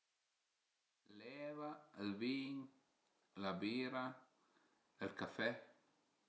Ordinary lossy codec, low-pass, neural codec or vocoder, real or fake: none; none; none; real